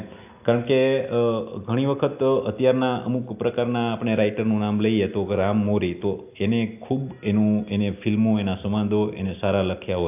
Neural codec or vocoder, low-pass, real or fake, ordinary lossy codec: none; 3.6 kHz; real; AAC, 32 kbps